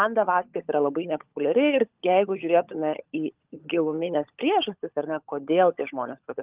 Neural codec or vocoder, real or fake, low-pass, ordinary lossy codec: codec, 16 kHz, 16 kbps, FunCodec, trained on LibriTTS, 50 frames a second; fake; 3.6 kHz; Opus, 32 kbps